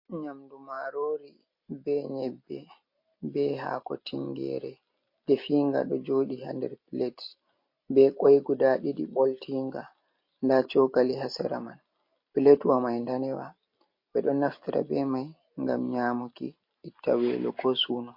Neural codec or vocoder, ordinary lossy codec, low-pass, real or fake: none; MP3, 32 kbps; 5.4 kHz; real